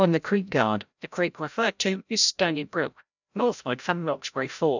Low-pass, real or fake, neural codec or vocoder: 7.2 kHz; fake; codec, 16 kHz, 0.5 kbps, FreqCodec, larger model